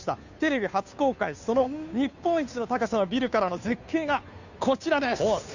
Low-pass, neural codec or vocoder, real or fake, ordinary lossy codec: 7.2 kHz; codec, 16 kHz, 2 kbps, FunCodec, trained on Chinese and English, 25 frames a second; fake; none